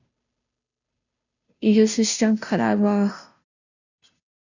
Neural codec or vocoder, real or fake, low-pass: codec, 16 kHz, 0.5 kbps, FunCodec, trained on Chinese and English, 25 frames a second; fake; 7.2 kHz